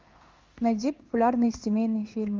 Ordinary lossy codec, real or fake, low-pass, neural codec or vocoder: Opus, 32 kbps; fake; 7.2 kHz; codec, 16 kHz in and 24 kHz out, 1 kbps, XY-Tokenizer